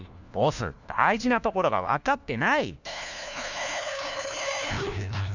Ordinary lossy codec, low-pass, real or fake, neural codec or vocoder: none; 7.2 kHz; fake; codec, 16 kHz, 1 kbps, FunCodec, trained on LibriTTS, 50 frames a second